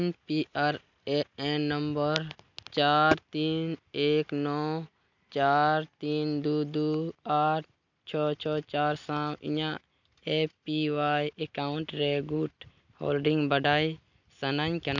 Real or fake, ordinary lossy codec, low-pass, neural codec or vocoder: real; none; 7.2 kHz; none